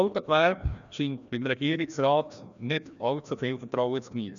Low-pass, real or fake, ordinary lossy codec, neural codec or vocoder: 7.2 kHz; fake; none; codec, 16 kHz, 1 kbps, FreqCodec, larger model